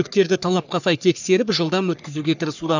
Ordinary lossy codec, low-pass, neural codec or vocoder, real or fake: none; 7.2 kHz; codec, 44.1 kHz, 3.4 kbps, Pupu-Codec; fake